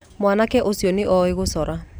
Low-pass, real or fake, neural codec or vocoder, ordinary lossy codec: none; real; none; none